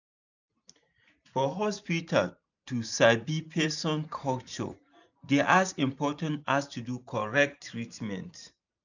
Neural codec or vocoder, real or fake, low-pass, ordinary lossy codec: vocoder, 44.1 kHz, 128 mel bands every 512 samples, BigVGAN v2; fake; 7.2 kHz; none